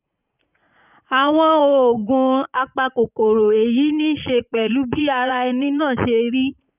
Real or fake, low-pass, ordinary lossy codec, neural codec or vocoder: fake; 3.6 kHz; none; vocoder, 44.1 kHz, 80 mel bands, Vocos